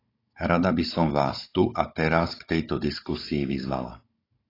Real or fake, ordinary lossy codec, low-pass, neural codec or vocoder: fake; AAC, 24 kbps; 5.4 kHz; codec, 16 kHz, 16 kbps, FunCodec, trained on Chinese and English, 50 frames a second